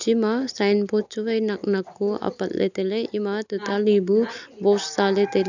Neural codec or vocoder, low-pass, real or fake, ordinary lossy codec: none; 7.2 kHz; real; none